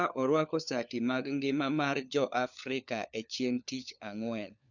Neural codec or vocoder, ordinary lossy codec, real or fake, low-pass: codec, 16 kHz, 4 kbps, FunCodec, trained on LibriTTS, 50 frames a second; none; fake; 7.2 kHz